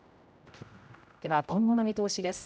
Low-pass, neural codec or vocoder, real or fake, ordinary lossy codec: none; codec, 16 kHz, 0.5 kbps, X-Codec, HuBERT features, trained on general audio; fake; none